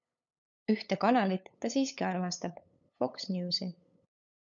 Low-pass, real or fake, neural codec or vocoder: 7.2 kHz; fake; codec, 16 kHz, 8 kbps, FunCodec, trained on LibriTTS, 25 frames a second